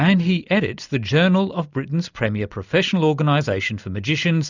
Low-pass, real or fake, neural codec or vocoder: 7.2 kHz; real; none